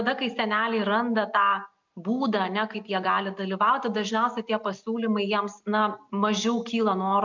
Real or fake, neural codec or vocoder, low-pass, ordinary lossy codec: real; none; 7.2 kHz; MP3, 64 kbps